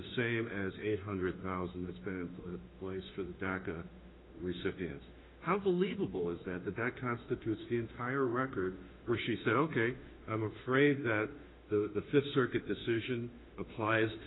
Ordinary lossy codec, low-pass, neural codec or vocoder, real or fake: AAC, 16 kbps; 7.2 kHz; autoencoder, 48 kHz, 32 numbers a frame, DAC-VAE, trained on Japanese speech; fake